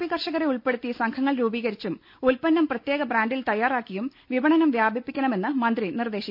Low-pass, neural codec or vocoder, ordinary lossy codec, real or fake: 5.4 kHz; none; none; real